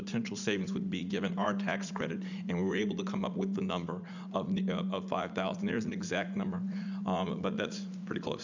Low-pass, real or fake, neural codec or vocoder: 7.2 kHz; fake; vocoder, 44.1 kHz, 80 mel bands, Vocos